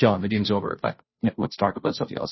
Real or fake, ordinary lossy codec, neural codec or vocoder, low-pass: fake; MP3, 24 kbps; codec, 16 kHz, 0.5 kbps, FunCodec, trained on Chinese and English, 25 frames a second; 7.2 kHz